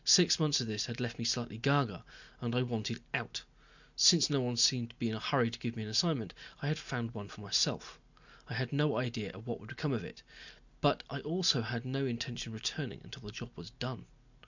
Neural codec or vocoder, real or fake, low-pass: none; real; 7.2 kHz